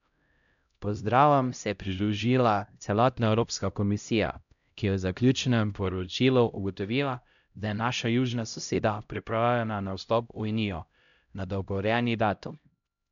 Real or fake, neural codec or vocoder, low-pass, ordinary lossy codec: fake; codec, 16 kHz, 0.5 kbps, X-Codec, HuBERT features, trained on LibriSpeech; 7.2 kHz; MP3, 96 kbps